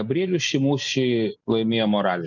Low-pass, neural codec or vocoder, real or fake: 7.2 kHz; none; real